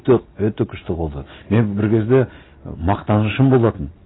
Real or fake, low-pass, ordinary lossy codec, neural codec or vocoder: real; 7.2 kHz; AAC, 16 kbps; none